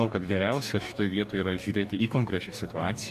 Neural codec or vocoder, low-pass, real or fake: codec, 44.1 kHz, 2.6 kbps, DAC; 14.4 kHz; fake